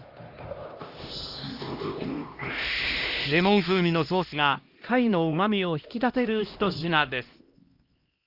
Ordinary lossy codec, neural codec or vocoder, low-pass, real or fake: Opus, 32 kbps; codec, 16 kHz, 1 kbps, X-Codec, HuBERT features, trained on LibriSpeech; 5.4 kHz; fake